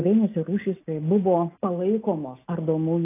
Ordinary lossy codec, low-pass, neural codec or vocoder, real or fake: AAC, 24 kbps; 3.6 kHz; none; real